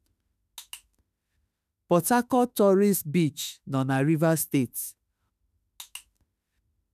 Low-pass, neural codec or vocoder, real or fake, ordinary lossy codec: 14.4 kHz; autoencoder, 48 kHz, 32 numbers a frame, DAC-VAE, trained on Japanese speech; fake; none